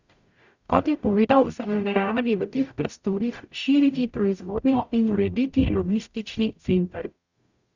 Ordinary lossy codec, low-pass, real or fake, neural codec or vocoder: Opus, 64 kbps; 7.2 kHz; fake; codec, 44.1 kHz, 0.9 kbps, DAC